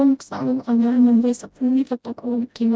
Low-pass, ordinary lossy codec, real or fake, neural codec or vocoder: none; none; fake; codec, 16 kHz, 0.5 kbps, FreqCodec, smaller model